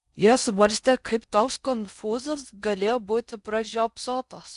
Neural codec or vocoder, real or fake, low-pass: codec, 16 kHz in and 24 kHz out, 0.6 kbps, FocalCodec, streaming, 4096 codes; fake; 10.8 kHz